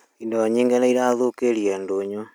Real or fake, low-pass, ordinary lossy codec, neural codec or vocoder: real; none; none; none